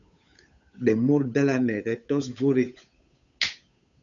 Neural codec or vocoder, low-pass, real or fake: codec, 16 kHz, 8 kbps, FunCodec, trained on Chinese and English, 25 frames a second; 7.2 kHz; fake